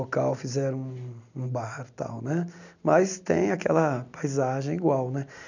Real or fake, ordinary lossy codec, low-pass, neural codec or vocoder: real; none; 7.2 kHz; none